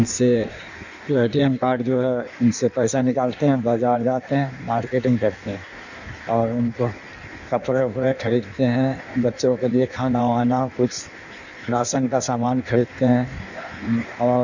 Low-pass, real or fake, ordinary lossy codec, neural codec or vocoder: 7.2 kHz; fake; none; codec, 16 kHz in and 24 kHz out, 1.1 kbps, FireRedTTS-2 codec